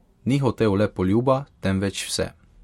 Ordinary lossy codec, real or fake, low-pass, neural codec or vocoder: MP3, 64 kbps; real; 19.8 kHz; none